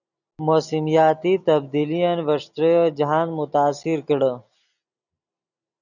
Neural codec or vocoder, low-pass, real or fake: none; 7.2 kHz; real